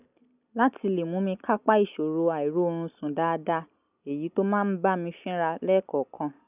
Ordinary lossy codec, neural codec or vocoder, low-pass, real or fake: none; none; 3.6 kHz; real